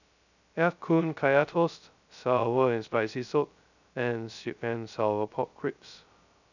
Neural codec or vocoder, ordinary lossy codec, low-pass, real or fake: codec, 16 kHz, 0.2 kbps, FocalCodec; none; 7.2 kHz; fake